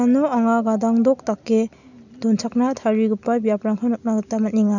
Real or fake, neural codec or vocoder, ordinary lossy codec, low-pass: real; none; none; 7.2 kHz